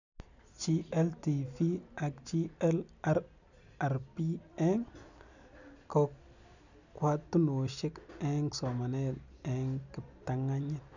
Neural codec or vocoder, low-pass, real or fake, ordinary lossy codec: none; 7.2 kHz; real; none